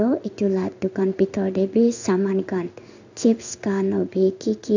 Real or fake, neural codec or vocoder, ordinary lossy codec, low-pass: fake; codec, 16 kHz in and 24 kHz out, 1 kbps, XY-Tokenizer; none; 7.2 kHz